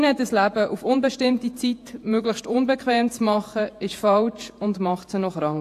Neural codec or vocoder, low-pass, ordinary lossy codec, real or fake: vocoder, 48 kHz, 128 mel bands, Vocos; 14.4 kHz; AAC, 64 kbps; fake